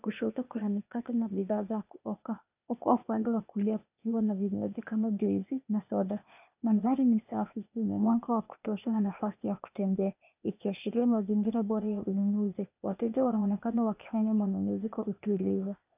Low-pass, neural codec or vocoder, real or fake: 3.6 kHz; codec, 16 kHz, 0.8 kbps, ZipCodec; fake